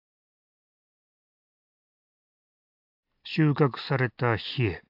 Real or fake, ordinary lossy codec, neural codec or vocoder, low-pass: real; AAC, 48 kbps; none; 5.4 kHz